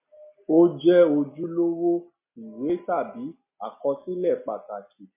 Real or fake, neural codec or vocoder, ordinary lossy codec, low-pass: real; none; MP3, 24 kbps; 3.6 kHz